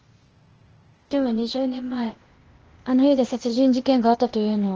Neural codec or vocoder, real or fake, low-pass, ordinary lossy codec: codec, 16 kHz, 0.8 kbps, ZipCodec; fake; 7.2 kHz; Opus, 16 kbps